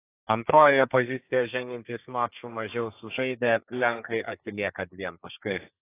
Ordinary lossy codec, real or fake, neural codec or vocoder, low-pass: AAC, 24 kbps; fake; codec, 32 kHz, 1.9 kbps, SNAC; 3.6 kHz